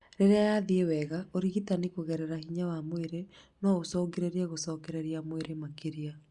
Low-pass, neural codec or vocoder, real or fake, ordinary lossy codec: 10.8 kHz; none; real; Opus, 64 kbps